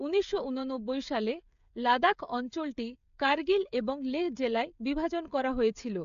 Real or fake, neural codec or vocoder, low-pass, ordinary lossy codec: fake; codec, 16 kHz, 16 kbps, FreqCodec, smaller model; 7.2 kHz; AAC, 64 kbps